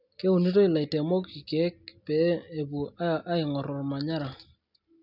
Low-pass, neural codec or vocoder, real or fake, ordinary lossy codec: 5.4 kHz; none; real; none